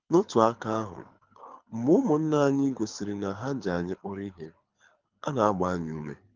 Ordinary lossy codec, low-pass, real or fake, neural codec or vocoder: Opus, 32 kbps; 7.2 kHz; fake; codec, 24 kHz, 6 kbps, HILCodec